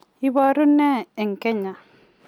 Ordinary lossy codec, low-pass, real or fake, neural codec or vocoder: none; 19.8 kHz; real; none